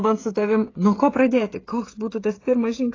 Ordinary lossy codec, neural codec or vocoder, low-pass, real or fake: AAC, 32 kbps; codec, 16 kHz, 16 kbps, FreqCodec, smaller model; 7.2 kHz; fake